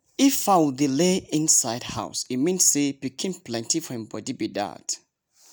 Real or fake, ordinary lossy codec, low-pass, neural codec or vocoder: real; none; none; none